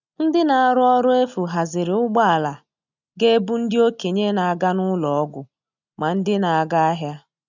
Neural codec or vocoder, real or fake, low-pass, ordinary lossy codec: none; real; 7.2 kHz; none